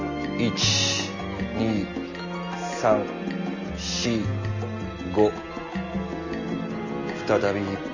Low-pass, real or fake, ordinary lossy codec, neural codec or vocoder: 7.2 kHz; real; none; none